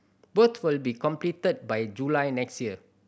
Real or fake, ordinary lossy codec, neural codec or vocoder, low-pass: real; none; none; none